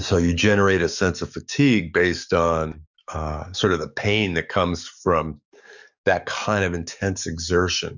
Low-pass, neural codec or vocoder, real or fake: 7.2 kHz; codec, 44.1 kHz, 7.8 kbps, DAC; fake